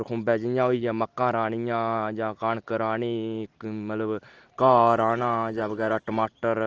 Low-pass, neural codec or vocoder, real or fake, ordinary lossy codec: 7.2 kHz; none; real; Opus, 16 kbps